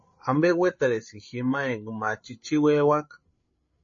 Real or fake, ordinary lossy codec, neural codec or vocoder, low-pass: fake; MP3, 32 kbps; codec, 16 kHz, 8 kbps, FreqCodec, larger model; 7.2 kHz